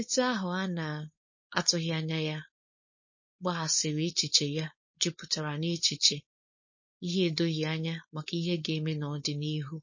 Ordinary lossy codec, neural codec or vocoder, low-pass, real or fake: MP3, 32 kbps; codec, 16 kHz, 4.8 kbps, FACodec; 7.2 kHz; fake